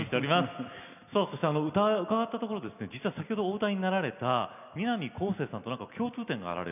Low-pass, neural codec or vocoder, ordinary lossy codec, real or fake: 3.6 kHz; none; none; real